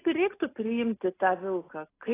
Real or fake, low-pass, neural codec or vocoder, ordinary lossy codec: real; 3.6 kHz; none; AAC, 16 kbps